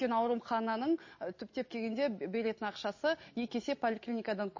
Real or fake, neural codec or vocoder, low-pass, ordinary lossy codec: real; none; 7.2 kHz; MP3, 32 kbps